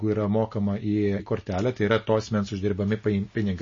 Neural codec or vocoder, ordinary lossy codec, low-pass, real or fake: none; MP3, 32 kbps; 7.2 kHz; real